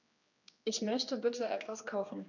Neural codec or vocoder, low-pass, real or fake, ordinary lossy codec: codec, 16 kHz, 2 kbps, X-Codec, HuBERT features, trained on general audio; 7.2 kHz; fake; none